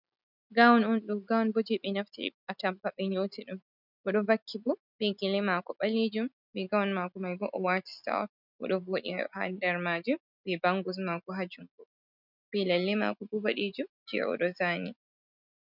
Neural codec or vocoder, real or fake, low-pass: none; real; 5.4 kHz